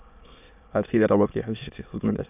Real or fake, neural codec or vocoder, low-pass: fake; autoencoder, 22.05 kHz, a latent of 192 numbers a frame, VITS, trained on many speakers; 3.6 kHz